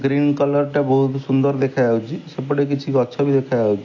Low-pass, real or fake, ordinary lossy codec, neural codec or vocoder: 7.2 kHz; real; AAC, 48 kbps; none